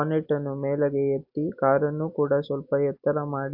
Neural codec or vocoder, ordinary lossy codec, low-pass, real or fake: none; AAC, 48 kbps; 5.4 kHz; real